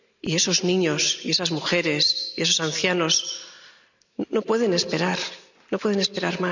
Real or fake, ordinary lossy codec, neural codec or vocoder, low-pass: real; none; none; 7.2 kHz